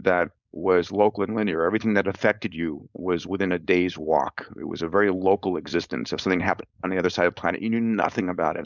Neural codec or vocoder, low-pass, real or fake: codec, 16 kHz, 4.8 kbps, FACodec; 7.2 kHz; fake